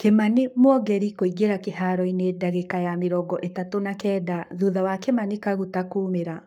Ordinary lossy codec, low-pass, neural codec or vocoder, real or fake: none; 19.8 kHz; codec, 44.1 kHz, 7.8 kbps, Pupu-Codec; fake